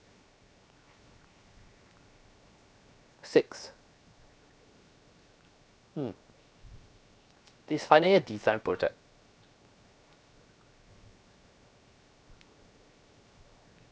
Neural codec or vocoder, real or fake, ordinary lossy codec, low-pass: codec, 16 kHz, 0.7 kbps, FocalCodec; fake; none; none